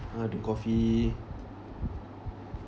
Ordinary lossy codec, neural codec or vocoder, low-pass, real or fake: none; none; none; real